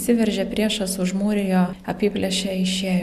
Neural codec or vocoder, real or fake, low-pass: none; real; 14.4 kHz